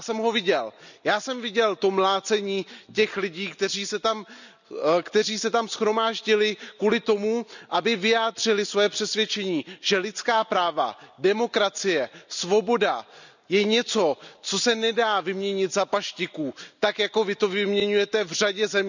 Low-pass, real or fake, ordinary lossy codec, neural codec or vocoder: 7.2 kHz; real; none; none